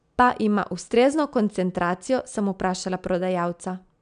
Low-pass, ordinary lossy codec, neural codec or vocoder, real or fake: 9.9 kHz; none; none; real